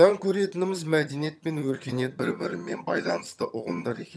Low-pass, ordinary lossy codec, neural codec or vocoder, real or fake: none; none; vocoder, 22.05 kHz, 80 mel bands, HiFi-GAN; fake